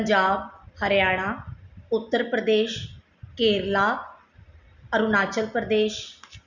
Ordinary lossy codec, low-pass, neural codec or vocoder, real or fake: none; 7.2 kHz; none; real